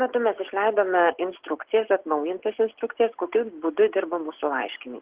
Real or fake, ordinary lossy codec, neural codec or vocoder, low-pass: real; Opus, 16 kbps; none; 3.6 kHz